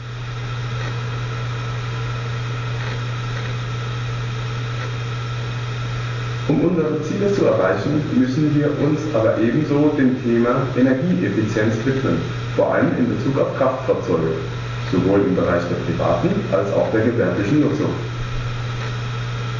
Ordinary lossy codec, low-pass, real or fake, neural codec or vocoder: AAC, 32 kbps; 7.2 kHz; real; none